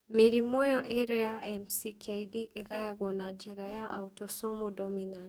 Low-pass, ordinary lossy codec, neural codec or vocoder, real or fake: none; none; codec, 44.1 kHz, 2.6 kbps, DAC; fake